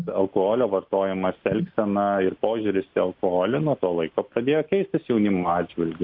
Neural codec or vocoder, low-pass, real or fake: none; 5.4 kHz; real